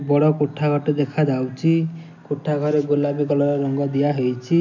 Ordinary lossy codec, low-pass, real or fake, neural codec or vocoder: none; 7.2 kHz; real; none